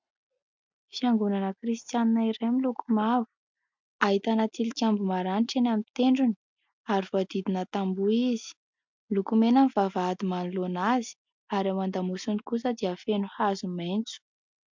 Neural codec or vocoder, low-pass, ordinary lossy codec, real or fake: none; 7.2 kHz; MP3, 64 kbps; real